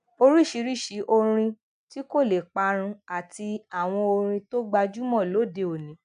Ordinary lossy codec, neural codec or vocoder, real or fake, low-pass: none; none; real; 9.9 kHz